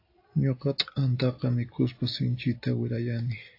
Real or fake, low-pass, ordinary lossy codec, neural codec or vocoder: real; 5.4 kHz; AAC, 32 kbps; none